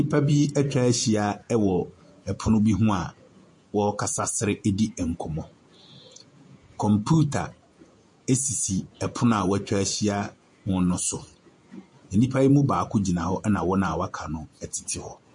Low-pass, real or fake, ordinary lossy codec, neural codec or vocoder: 10.8 kHz; fake; MP3, 48 kbps; vocoder, 48 kHz, 128 mel bands, Vocos